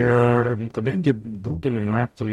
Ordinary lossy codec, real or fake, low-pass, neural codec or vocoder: MP3, 64 kbps; fake; 19.8 kHz; codec, 44.1 kHz, 0.9 kbps, DAC